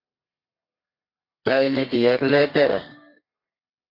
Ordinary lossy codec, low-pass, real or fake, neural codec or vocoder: MP3, 32 kbps; 5.4 kHz; fake; codec, 32 kHz, 1.9 kbps, SNAC